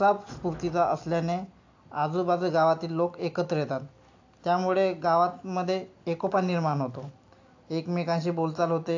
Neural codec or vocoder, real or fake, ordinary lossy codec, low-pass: none; real; AAC, 48 kbps; 7.2 kHz